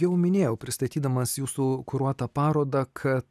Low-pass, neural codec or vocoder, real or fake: 14.4 kHz; none; real